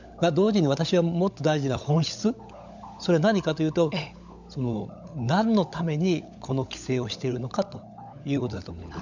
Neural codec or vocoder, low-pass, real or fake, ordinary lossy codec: codec, 16 kHz, 16 kbps, FunCodec, trained on LibriTTS, 50 frames a second; 7.2 kHz; fake; none